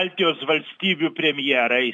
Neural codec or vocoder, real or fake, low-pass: none; real; 9.9 kHz